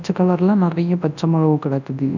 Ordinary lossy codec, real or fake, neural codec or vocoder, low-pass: none; fake; codec, 24 kHz, 0.9 kbps, WavTokenizer, large speech release; 7.2 kHz